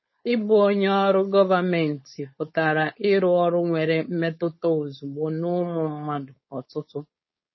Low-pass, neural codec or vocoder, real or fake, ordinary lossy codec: 7.2 kHz; codec, 16 kHz, 4.8 kbps, FACodec; fake; MP3, 24 kbps